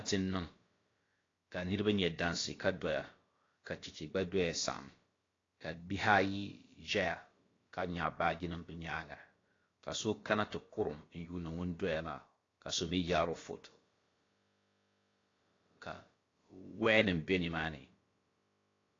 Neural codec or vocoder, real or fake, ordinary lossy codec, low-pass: codec, 16 kHz, about 1 kbps, DyCAST, with the encoder's durations; fake; AAC, 32 kbps; 7.2 kHz